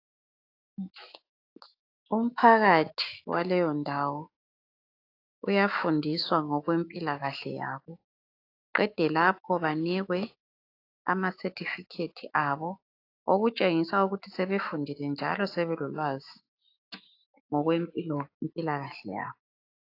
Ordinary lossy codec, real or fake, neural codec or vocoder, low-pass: AAC, 32 kbps; fake; autoencoder, 48 kHz, 128 numbers a frame, DAC-VAE, trained on Japanese speech; 5.4 kHz